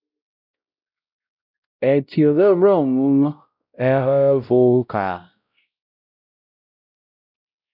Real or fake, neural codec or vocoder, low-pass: fake; codec, 16 kHz, 0.5 kbps, X-Codec, WavLM features, trained on Multilingual LibriSpeech; 5.4 kHz